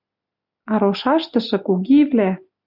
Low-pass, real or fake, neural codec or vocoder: 5.4 kHz; real; none